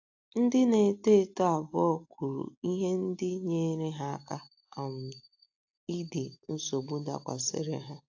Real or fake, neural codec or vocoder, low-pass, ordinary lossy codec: real; none; 7.2 kHz; AAC, 48 kbps